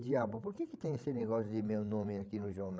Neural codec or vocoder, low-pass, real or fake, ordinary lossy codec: codec, 16 kHz, 16 kbps, FreqCodec, larger model; none; fake; none